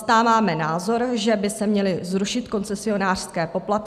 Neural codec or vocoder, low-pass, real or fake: none; 14.4 kHz; real